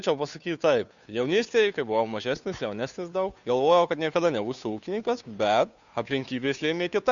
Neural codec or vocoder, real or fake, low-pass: codec, 16 kHz, 2 kbps, FunCodec, trained on Chinese and English, 25 frames a second; fake; 7.2 kHz